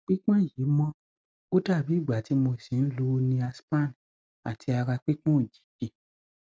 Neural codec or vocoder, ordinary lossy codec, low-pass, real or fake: none; none; none; real